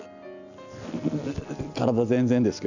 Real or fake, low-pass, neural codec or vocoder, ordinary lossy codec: real; 7.2 kHz; none; none